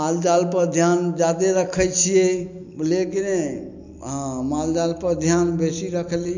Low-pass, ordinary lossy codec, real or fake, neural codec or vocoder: 7.2 kHz; none; real; none